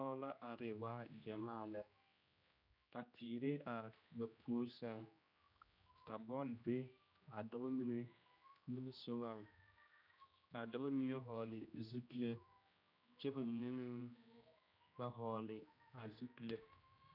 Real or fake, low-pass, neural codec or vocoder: fake; 5.4 kHz; codec, 16 kHz, 1 kbps, X-Codec, HuBERT features, trained on general audio